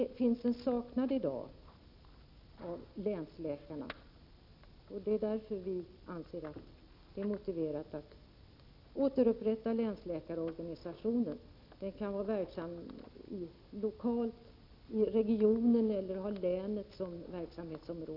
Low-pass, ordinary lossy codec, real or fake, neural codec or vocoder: 5.4 kHz; none; real; none